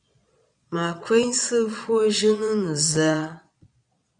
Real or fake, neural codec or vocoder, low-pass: fake; vocoder, 22.05 kHz, 80 mel bands, Vocos; 9.9 kHz